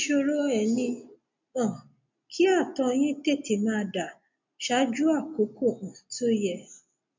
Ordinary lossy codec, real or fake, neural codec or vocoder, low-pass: MP3, 64 kbps; real; none; 7.2 kHz